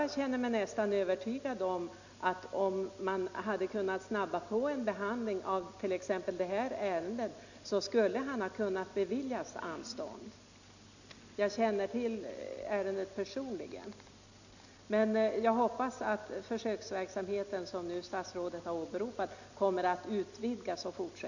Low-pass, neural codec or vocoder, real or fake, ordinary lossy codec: 7.2 kHz; none; real; none